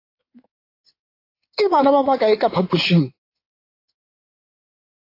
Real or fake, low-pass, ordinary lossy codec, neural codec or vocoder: fake; 5.4 kHz; AAC, 32 kbps; codec, 16 kHz in and 24 kHz out, 2.2 kbps, FireRedTTS-2 codec